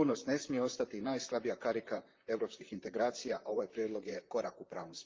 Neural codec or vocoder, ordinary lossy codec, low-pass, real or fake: vocoder, 44.1 kHz, 128 mel bands, Pupu-Vocoder; Opus, 32 kbps; 7.2 kHz; fake